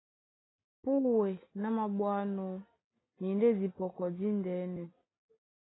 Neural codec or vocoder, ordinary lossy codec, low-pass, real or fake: none; AAC, 16 kbps; 7.2 kHz; real